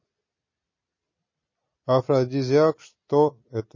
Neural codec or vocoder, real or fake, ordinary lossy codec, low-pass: none; real; MP3, 32 kbps; 7.2 kHz